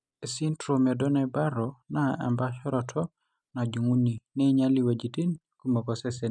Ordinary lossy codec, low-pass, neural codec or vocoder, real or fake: none; 9.9 kHz; none; real